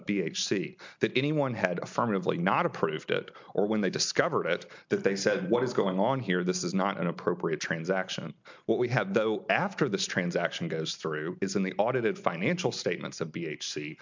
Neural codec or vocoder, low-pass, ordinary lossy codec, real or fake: none; 7.2 kHz; MP3, 64 kbps; real